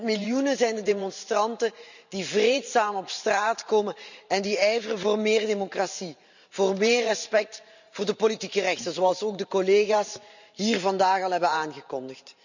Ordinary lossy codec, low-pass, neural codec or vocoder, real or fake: none; 7.2 kHz; vocoder, 44.1 kHz, 128 mel bands every 512 samples, BigVGAN v2; fake